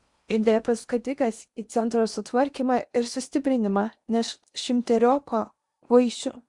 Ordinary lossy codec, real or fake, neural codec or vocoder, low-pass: Opus, 64 kbps; fake; codec, 16 kHz in and 24 kHz out, 0.8 kbps, FocalCodec, streaming, 65536 codes; 10.8 kHz